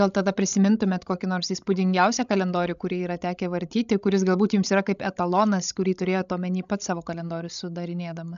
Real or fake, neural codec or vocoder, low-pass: fake; codec, 16 kHz, 16 kbps, FreqCodec, larger model; 7.2 kHz